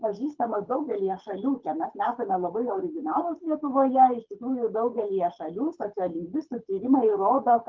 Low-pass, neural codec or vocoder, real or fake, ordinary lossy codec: 7.2 kHz; codec, 24 kHz, 6 kbps, HILCodec; fake; Opus, 24 kbps